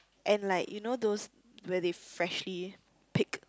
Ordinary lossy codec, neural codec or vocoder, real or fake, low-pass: none; none; real; none